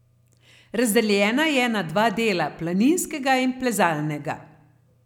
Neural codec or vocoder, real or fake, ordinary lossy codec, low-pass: none; real; none; 19.8 kHz